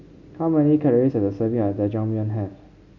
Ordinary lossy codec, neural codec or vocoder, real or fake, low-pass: none; none; real; 7.2 kHz